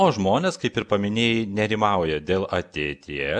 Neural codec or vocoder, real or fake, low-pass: none; real; 9.9 kHz